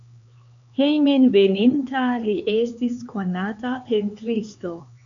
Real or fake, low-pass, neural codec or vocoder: fake; 7.2 kHz; codec, 16 kHz, 4 kbps, X-Codec, HuBERT features, trained on LibriSpeech